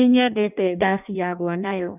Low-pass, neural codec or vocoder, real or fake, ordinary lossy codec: 3.6 kHz; codec, 16 kHz in and 24 kHz out, 0.6 kbps, FireRedTTS-2 codec; fake; none